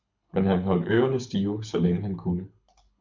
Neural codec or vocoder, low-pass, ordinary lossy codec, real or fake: codec, 24 kHz, 6 kbps, HILCodec; 7.2 kHz; MP3, 64 kbps; fake